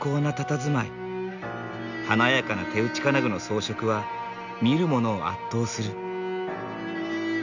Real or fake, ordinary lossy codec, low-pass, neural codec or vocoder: real; none; 7.2 kHz; none